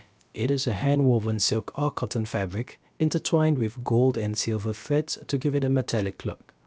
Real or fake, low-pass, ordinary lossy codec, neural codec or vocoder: fake; none; none; codec, 16 kHz, about 1 kbps, DyCAST, with the encoder's durations